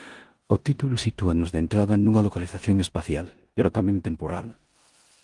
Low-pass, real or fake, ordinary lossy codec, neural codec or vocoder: 10.8 kHz; fake; Opus, 24 kbps; codec, 16 kHz in and 24 kHz out, 0.9 kbps, LongCat-Audio-Codec, four codebook decoder